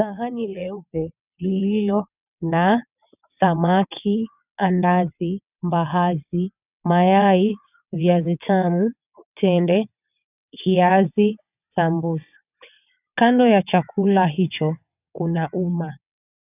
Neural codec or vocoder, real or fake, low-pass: vocoder, 22.05 kHz, 80 mel bands, WaveNeXt; fake; 3.6 kHz